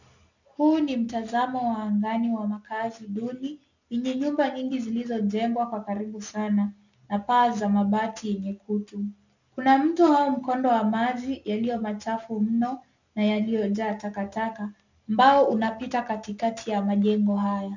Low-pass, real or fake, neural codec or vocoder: 7.2 kHz; real; none